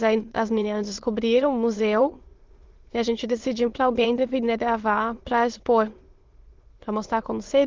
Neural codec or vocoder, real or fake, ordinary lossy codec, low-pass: autoencoder, 22.05 kHz, a latent of 192 numbers a frame, VITS, trained on many speakers; fake; Opus, 16 kbps; 7.2 kHz